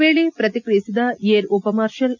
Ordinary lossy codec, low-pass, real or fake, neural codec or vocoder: none; 7.2 kHz; real; none